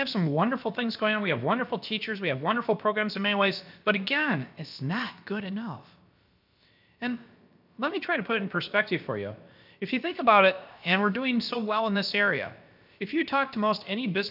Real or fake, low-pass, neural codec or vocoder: fake; 5.4 kHz; codec, 16 kHz, about 1 kbps, DyCAST, with the encoder's durations